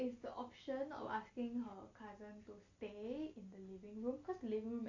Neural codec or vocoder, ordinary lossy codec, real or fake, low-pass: none; Opus, 64 kbps; real; 7.2 kHz